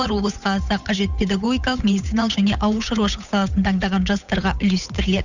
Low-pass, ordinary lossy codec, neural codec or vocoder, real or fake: 7.2 kHz; none; codec, 16 kHz, 8 kbps, FunCodec, trained on Chinese and English, 25 frames a second; fake